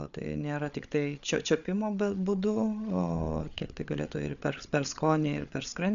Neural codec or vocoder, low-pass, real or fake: codec, 16 kHz, 8 kbps, FreqCodec, larger model; 7.2 kHz; fake